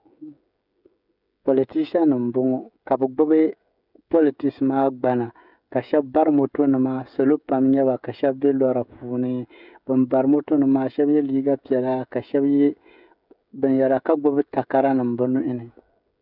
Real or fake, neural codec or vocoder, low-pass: fake; codec, 16 kHz, 16 kbps, FreqCodec, smaller model; 5.4 kHz